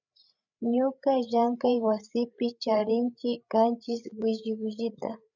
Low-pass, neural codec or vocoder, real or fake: 7.2 kHz; codec, 16 kHz, 8 kbps, FreqCodec, larger model; fake